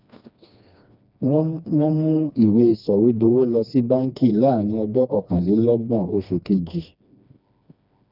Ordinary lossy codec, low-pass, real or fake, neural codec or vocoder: none; 5.4 kHz; fake; codec, 16 kHz, 2 kbps, FreqCodec, smaller model